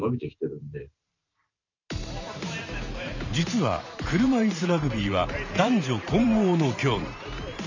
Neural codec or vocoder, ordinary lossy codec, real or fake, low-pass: vocoder, 44.1 kHz, 128 mel bands every 512 samples, BigVGAN v2; none; fake; 7.2 kHz